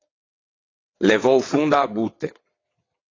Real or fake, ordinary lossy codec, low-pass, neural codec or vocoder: fake; AAC, 32 kbps; 7.2 kHz; vocoder, 22.05 kHz, 80 mel bands, WaveNeXt